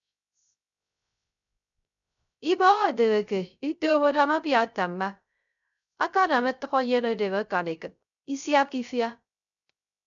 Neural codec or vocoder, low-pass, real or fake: codec, 16 kHz, 0.3 kbps, FocalCodec; 7.2 kHz; fake